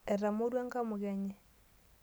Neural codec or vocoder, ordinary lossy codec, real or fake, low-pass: none; none; real; none